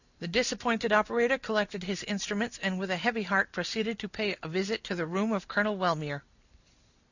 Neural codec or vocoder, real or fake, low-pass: none; real; 7.2 kHz